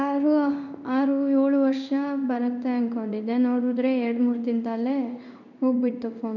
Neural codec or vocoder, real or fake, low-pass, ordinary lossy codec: codec, 16 kHz in and 24 kHz out, 1 kbps, XY-Tokenizer; fake; 7.2 kHz; none